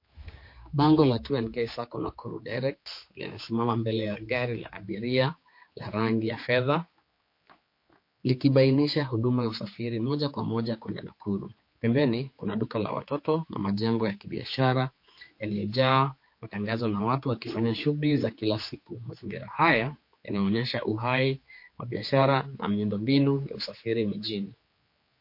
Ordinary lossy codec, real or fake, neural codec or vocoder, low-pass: MP3, 32 kbps; fake; codec, 16 kHz, 4 kbps, X-Codec, HuBERT features, trained on general audio; 5.4 kHz